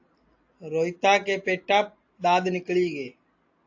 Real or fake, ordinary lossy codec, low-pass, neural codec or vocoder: real; AAC, 48 kbps; 7.2 kHz; none